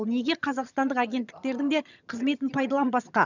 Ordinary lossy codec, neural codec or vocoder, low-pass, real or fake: none; vocoder, 22.05 kHz, 80 mel bands, HiFi-GAN; 7.2 kHz; fake